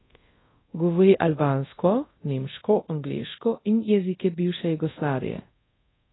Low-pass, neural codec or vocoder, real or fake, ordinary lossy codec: 7.2 kHz; codec, 24 kHz, 0.5 kbps, DualCodec; fake; AAC, 16 kbps